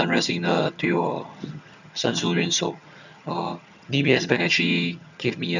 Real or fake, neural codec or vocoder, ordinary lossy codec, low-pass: fake; vocoder, 22.05 kHz, 80 mel bands, HiFi-GAN; none; 7.2 kHz